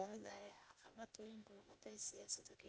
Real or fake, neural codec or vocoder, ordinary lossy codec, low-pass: fake; codec, 16 kHz, 0.8 kbps, ZipCodec; none; none